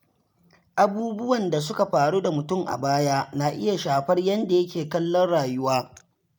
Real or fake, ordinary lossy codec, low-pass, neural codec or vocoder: real; none; none; none